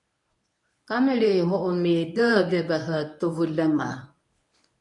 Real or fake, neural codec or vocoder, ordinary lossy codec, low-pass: fake; codec, 24 kHz, 0.9 kbps, WavTokenizer, medium speech release version 1; MP3, 96 kbps; 10.8 kHz